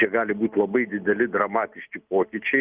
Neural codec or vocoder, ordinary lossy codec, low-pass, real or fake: none; Opus, 24 kbps; 3.6 kHz; real